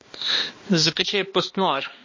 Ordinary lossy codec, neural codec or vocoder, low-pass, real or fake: MP3, 32 kbps; codec, 16 kHz, 1 kbps, X-Codec, HuBERT features, trained on balanced general audio; 7.2 kHz; fake